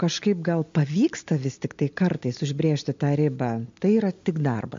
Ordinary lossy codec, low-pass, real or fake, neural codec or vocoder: MP3, 48 kbps; 7.2 kHz; real; none